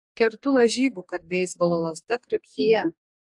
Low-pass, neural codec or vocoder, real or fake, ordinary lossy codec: 10.8 kHz; codec, 44.1 kHz, 2.6 kbps, DAC; fake; AAC, 64 kbps